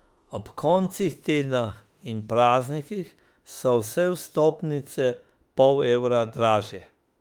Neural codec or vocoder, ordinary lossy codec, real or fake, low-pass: autoencoder, 48 kHz, 32 numbers a frame, DAC-VAE, trained on Japanese speech; Opus, 32 kbps; fake; 19.8 kHz